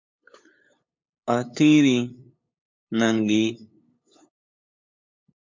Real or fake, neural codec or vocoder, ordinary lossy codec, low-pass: fake; codec, 16 kHz, 8 kbps, FunCodec, trained on LibriTTS, 25 frames a second; MP3, 48 kbps; 7.2 kHz